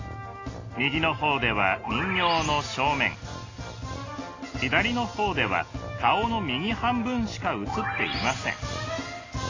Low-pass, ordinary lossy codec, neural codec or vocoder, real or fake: 7.2 kHz; AAC, 32 kbps; none; real